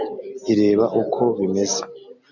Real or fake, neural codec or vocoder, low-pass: real; none; 7.2 kHz